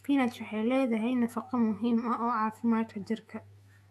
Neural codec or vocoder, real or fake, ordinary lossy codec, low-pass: autoencoder, 48 kHz, 128 numbers a frame, DAC-VAE, trained on Japanese speech; fake; AAC, 96 kbps; 14.4 kHz